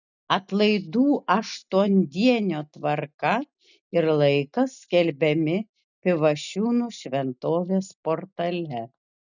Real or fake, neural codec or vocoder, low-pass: real; none; 7.2 kHz